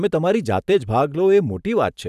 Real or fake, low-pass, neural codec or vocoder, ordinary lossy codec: fake; 14.4 kHz; vocoder, 44.1 kHz, 128 mel bands every 512 samples, BigVGAN v2; none